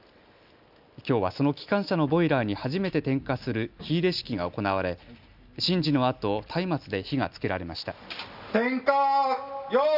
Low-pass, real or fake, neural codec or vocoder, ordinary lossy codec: 5.4 kHz; real; none; none